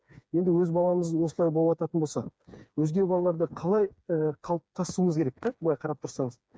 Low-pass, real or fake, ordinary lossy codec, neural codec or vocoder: none; fake; none; codec, 16 kHz, 4 kbps, FreqCodec, smaller model